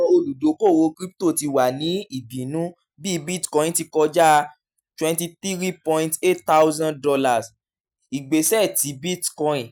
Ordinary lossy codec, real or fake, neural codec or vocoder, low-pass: none; real; none; none